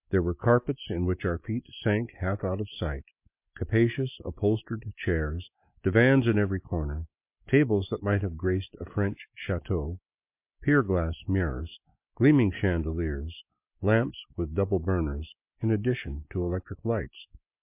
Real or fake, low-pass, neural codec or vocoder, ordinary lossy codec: real; 3.6 kHz; none; MP3, 32 kbps